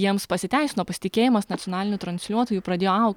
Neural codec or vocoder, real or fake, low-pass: none; real; 19.8 kHz